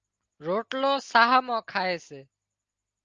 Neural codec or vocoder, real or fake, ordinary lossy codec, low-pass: none; real; Opus, 24 kbps; 7.2 kHz